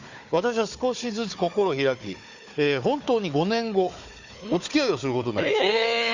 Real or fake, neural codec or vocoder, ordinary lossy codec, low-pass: fake; codec, 16 kHz, 4 kbps, FunCodec, trained on Chinese and English, 50 frames a second; Opus, 64 kbps; 7.2 kHz